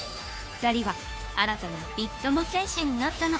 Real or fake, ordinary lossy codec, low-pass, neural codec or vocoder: fake; none; none; codec, 16 kHz, 2 kbps, FunCodec, trained on Chinese and English, 25 frames a second